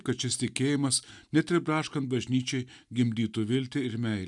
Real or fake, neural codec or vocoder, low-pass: real; none; 10.8 kHz